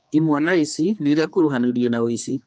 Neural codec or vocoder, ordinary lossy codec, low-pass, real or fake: codec, 16 kHz, 2 kbps, X-Codec, HuBERT features, trained on general audio; none; none; fake